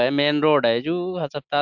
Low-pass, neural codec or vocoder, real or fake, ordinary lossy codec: 7.2 kHz; none; real; MP3, 64 kbps